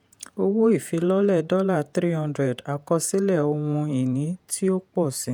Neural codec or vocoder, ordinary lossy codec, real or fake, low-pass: vocoder, 48 kHz, 128 mel bands, Vocos; none; fake; none